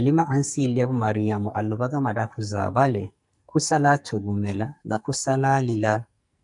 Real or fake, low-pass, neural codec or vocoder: fake; 10.8 kHz; codec, 44.1 kHz, 2.6 kbps, SNAC